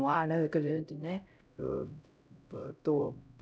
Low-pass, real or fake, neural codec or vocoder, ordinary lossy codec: none; fake; codec, 16 kHz, 0.5 kbps, X-Codec, HuBERT features, trained on LibriSpeech; none